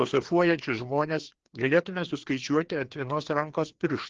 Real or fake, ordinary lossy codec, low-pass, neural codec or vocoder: fake; Opus, 16 kbps; 7.2 kHz; codec, 16 kHz, 2 kbps, FreqCodec, larger model